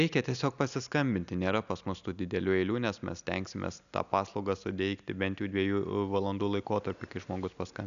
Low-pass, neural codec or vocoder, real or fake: 7.2 kHz; none; real